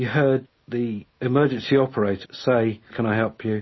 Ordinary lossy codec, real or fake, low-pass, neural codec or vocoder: MP3, 24 kbps; real; 7.2 kHz; none